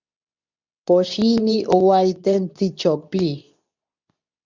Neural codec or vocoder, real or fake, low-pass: codec, 24 kHz, 0.9 kbps, WavTokenizer, medium speech release version 2; fake; 7.2 kHz